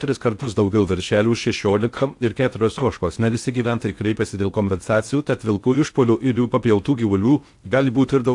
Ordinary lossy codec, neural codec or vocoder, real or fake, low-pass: AAC, 64 kbps; codec, 16 kHz in and 24 kHz out, 0.6 kbps, FocalCodec, streaming, 4096 codes; fake; 10.8 kHz